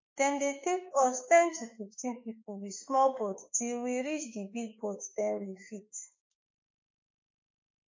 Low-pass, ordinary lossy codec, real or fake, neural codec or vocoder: 7.2 kHz; MP3, 32 kbps; fake; autoencoder, 48 kHz, 32 numbers a frame, DAC-VAE, trained on Japanese speech